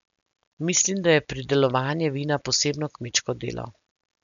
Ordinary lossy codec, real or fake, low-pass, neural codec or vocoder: none; real; 7.2 kHz; none